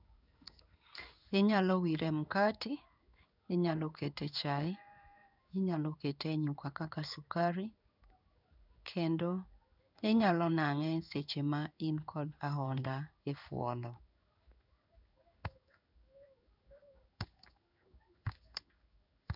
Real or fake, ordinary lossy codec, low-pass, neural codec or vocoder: fake; none; 5.4 kHz; codec, 16 kHz in and 24 kHz out, 1 kbps, XY-Tokenizer